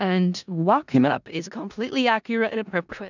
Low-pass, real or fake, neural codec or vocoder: 7.2 kHz; fake; codec, 16 kHz in and 24 kHz out, 0.4 kbps, LongCat-Audio-Codec, four codebook decoder